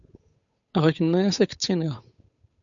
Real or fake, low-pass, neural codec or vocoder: fake; 7.2 kHz; codec, 16 kHz, 8 kbps, FunCodec, trained on Chinese and English, 25 frames a second